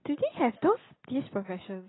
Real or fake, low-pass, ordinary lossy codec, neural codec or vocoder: real; 7.2 kHz; AAC, 16 kbps; none